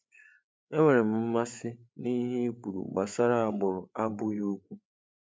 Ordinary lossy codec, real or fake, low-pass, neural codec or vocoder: none; fake; none; codec, 16 kHz, 8 kbps, FreqCodec, larger model